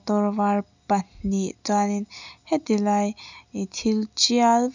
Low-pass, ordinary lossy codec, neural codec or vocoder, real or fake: 7.2 kHz; none; none; real